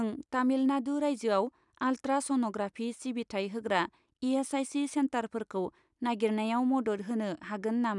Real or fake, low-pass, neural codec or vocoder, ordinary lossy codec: real; 10.8 kHz; none; none